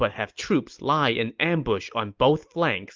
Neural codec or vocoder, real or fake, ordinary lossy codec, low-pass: none; real; Opus, 32 kbps; 7.2 kHz